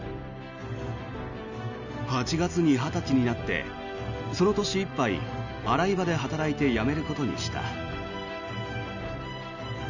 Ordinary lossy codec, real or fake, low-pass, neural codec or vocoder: none; real; 7.2 kHz; none